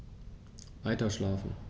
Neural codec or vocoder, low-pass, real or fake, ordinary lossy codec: none; none; real; none